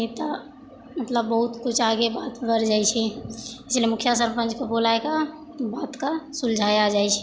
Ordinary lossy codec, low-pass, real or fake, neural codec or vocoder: none; none; real; none